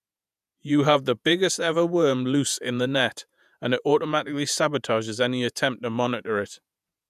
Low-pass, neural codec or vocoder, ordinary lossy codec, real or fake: 14.4 kHz; vocoder, 48 kHz, 128 mel bands, Vocos; none; fake